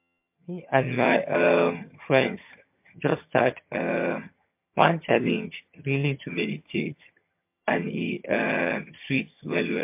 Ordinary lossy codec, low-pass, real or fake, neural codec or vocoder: MP3, 32 kbps; 3.6 kHz; fake; vocoder, 22.05 kHz, 80 mel bands, HiFi-GAN